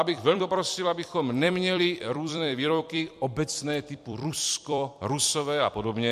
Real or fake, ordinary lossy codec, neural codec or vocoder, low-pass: fake; MP3, 64 kbps; vocoder, 44.1 kHz, 128 mel bands every 256 samples, BigVGAN v2; 14.4 kHz